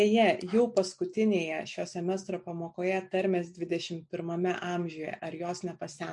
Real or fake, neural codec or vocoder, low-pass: real; none; 10.8 kHz